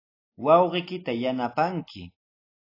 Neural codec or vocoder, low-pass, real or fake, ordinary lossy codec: none; 5.4 kHz; real; AAC, 32 kbps